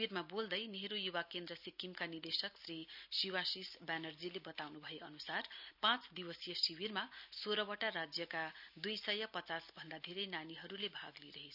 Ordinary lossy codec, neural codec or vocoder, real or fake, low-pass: none; none; real; 5.4 kHz